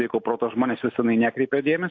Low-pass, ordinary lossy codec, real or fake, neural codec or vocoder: 7.2 kHz; AAC, 48 kbps; real; none